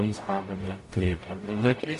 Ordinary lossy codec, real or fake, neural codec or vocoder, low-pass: MP3, 48 kbps; fake; codec, 44.1 kHz, 0.9 kbps, DAC; 14.4 kHz